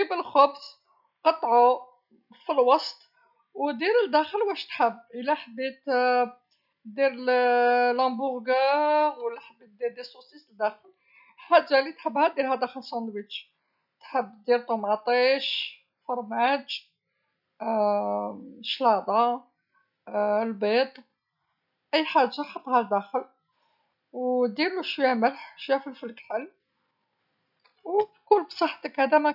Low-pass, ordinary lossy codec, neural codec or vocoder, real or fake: 5.4 kHz; none; none; real